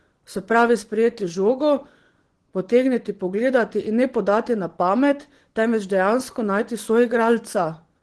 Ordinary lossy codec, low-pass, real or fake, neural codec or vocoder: Opus, 16 kbps; 10.8 kHz; fake; vocoder, 24 kHz, 100 mel bands, Vocos